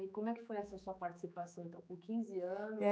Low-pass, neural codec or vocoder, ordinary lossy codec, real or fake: none; codec, 16 kHz, 4 kbps, X-Codec, HuBERT features, trained on general audio; none; fake